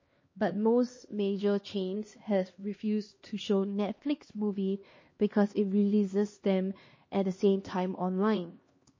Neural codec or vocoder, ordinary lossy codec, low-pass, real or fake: codec, 16 kHz, 2 kbps, X-Codec, HuBERT features, trained on LibriSpeech; MP3, 32 kbps; 7.2 kHz; fake